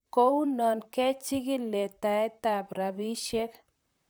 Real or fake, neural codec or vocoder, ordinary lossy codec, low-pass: fake; vocoder, 44.1 kHz, 128 mel bands, Pupu-Vocoder; none; none